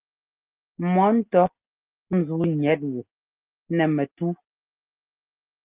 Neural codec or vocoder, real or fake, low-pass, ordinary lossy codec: none; real; 3.6 kHz; Opus, 32 kbps